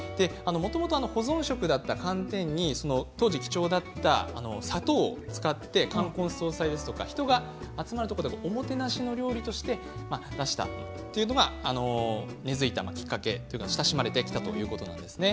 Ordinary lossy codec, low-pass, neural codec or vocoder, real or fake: none; none; none; real